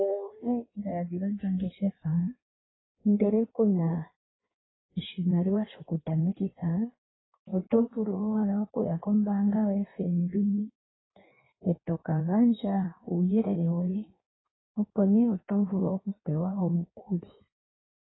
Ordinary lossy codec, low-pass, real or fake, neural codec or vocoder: AAC, 16 kbps; 7.2 kHz; fake; codec, 16 kHz in and 24 kHz out, 1.1 kbps, FireRedTTS-2 codec